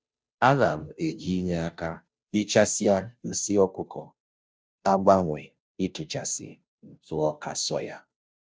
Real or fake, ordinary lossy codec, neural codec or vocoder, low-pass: fake; none; codec, 16 kHz, 0.5 kbps, FunCodec, trained on Chinese and English, 25 frames a second; none